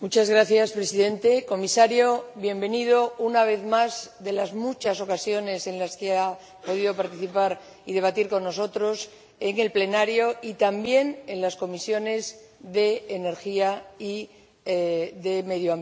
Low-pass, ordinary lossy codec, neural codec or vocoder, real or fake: none; none; none; real